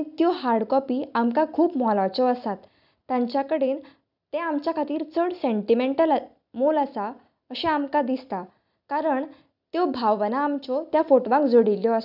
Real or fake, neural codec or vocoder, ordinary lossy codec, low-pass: real; none; none; 5.4 kHz